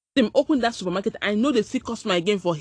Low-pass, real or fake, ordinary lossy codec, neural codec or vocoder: 9.9 kHz; real; AAC, 48 kbps; none